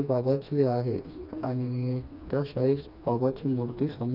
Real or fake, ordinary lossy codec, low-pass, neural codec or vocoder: fake; none; 5.4 kHz; codec, 16 kHz, 2 kbps, FreqCodec, smaller model